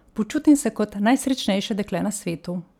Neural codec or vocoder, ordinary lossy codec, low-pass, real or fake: none; none; 19.8 kHz; real